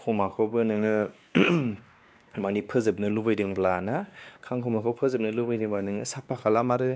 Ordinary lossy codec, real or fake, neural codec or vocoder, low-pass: none; fake; codec, 16 kHz, 2 kbps, X-Codec, WavLM features, trained on Multilingual LibriSpeech; none